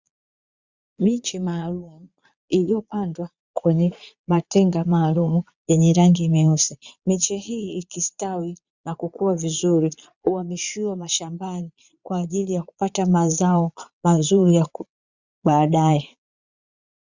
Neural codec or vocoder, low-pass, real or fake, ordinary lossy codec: vocoder, 22.05 kHz, 80 mel bands, Vocos; 7.2 kHz; fake; Opus, 64 kbps